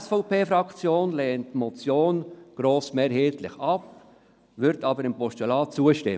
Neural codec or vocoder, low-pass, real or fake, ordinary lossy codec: none; none; real; none